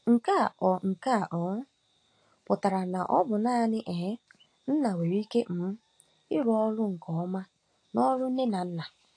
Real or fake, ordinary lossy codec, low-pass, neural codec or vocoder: real; AAC, 48 kbps; 9.9 kHz; none